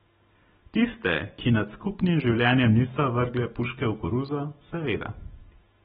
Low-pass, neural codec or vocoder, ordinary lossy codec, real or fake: 19.8 kHz; none; AAC, 16 kbps; real